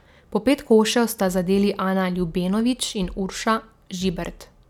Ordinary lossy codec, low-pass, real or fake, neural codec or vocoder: none; 19.8 kHz; real; none